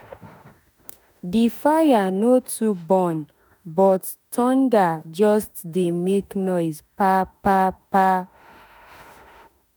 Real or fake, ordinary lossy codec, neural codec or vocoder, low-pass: fake; none; autoencoder, 48 kHz, 32 numbers a frame, DAC-VAE, trained on Japanese speech; none